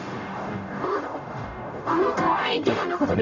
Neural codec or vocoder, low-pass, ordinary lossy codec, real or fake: codec, 44.1 kHz, 0.9 kbps, DAC; 7.2 kHz; none; fake